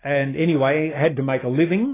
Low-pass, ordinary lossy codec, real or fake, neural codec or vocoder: 3.6 kHz; AAC, 16 kbps; real; none